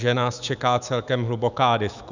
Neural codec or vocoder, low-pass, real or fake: autoencoder, 48 kHz, 128 numbers a frame, DAC-VAE, trained on Japanese speech; 7.2 kHz; fake